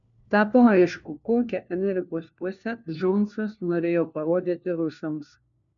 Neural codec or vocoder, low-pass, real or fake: codec, 16 kHz, 1 kbps, FunCodec, trained on LibriTTS, 50 frames a second; 7.2 kHz; fake